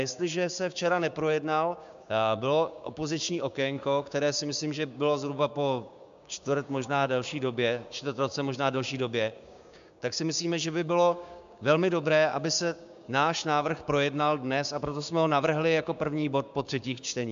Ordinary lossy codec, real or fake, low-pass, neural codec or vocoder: MP3, 64 kbps; fake; 7.2 kHz; codec, 16 kHz, 6 kbps, DAC